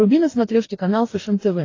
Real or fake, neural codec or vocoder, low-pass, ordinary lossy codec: fake; codec, 44.1 kHz, 2.6 kbps, DAC; 7.2 kHz; AAC, 32 kbps